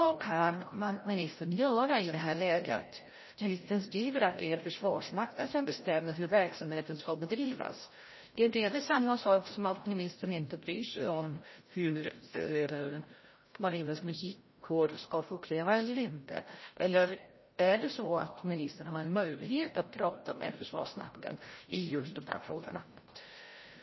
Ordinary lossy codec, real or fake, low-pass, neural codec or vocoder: MP3, 24 kbps; fake; 7.2 kHz; codec, 16 kHz, 0.5 kbps, FreqCodec, larger model